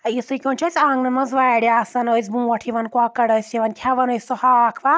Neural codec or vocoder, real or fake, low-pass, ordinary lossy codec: none; real; none; none